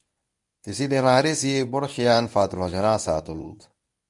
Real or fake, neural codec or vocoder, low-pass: fake; codec, 24 kHz, 0.9 kbps, WavTokenizer, medium speech release version 1; 10.8 kHz